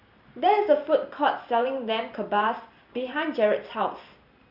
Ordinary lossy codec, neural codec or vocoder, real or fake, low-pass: none; vocoder, 44.1 kHz, 128 mel bands every 512 samples, BigVGAN v2; fake; 5.4 kHz